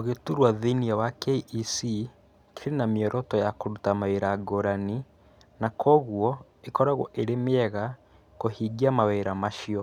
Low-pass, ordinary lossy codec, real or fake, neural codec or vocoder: 19.8 kHz; none; real; none